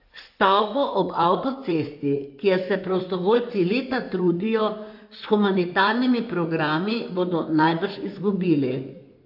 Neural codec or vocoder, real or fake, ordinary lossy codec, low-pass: codec, 16 kHz in and 24 kHz out, 2.2 kbps, FireRedTTS-2 codec; fake; AAC, 48 kbps; 5.4 kHz